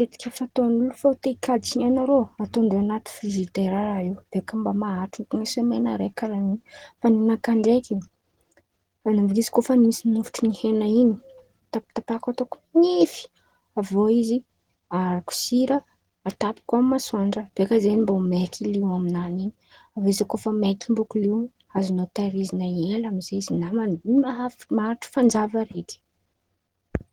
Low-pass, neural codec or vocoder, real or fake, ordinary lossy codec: 19.8 kHz; none; real; Opus, 16 kbps